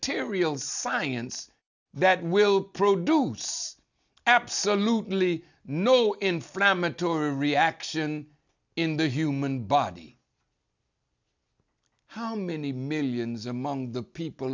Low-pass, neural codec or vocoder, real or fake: 7.2 kHz; none; real